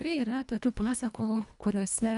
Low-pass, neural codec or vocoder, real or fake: 10.8 kHz; codec, 24 kHz, 1.5 kbps, HILCodec; fake